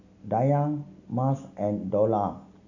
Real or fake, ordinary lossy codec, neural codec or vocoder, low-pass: real; none; none; 7.2 kHz